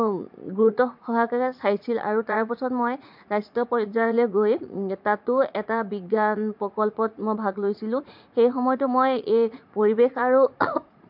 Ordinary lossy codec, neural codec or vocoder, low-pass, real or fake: MP3, 48 kbps; vocoder, 44.1 kHz, 80 mel bands, Vocos; 5.4 kHz; fake